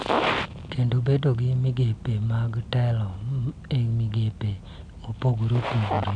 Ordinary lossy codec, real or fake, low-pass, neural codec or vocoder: none; real; 9.9 kHz; none